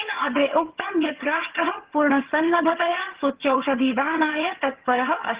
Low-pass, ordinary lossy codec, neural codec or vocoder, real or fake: 3.6 kHz; Opus, 16 kbps; vocoder, 22.05 kHz, 80 mel bands, HiFi-GAN; fake